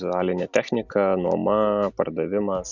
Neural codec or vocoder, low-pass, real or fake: none; 7.2 kHz; real